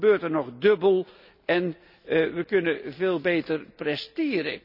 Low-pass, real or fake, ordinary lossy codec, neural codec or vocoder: 5.4 kHz; real; none; none